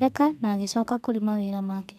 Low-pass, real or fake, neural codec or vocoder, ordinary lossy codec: 14.4 kHz; fake; codec, 32 kHz, 1.9 kbps, SNAC; none